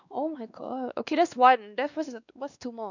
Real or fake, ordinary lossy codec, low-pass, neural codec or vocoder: fake; none; 7.2 kHz; codec, 16 kHz, 2 kbps, X-Codec, WavLM features, trained on Multilingual LibriSpeech